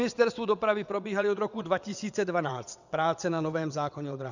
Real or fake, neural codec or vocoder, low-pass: fake; vocoder, 22.05 kHz, 80 mel bands, WaveNeXt; 7.2 kHz